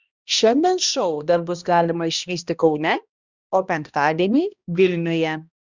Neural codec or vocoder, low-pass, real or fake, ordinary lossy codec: codec, 16 kHz, 1 kbps, X-Codec, HuBERT features, trained on balanced general audio; 7.2 kHz; fake; Opus, 64 kbps